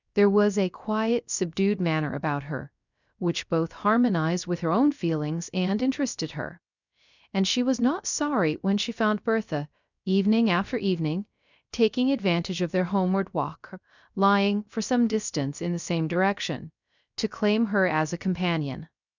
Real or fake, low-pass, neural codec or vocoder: fake; 7.2 kHz; codec, 16 kHz, 0.3 kbps, FocalCodec